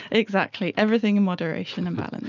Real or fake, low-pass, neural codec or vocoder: real; 7.2 kHz; none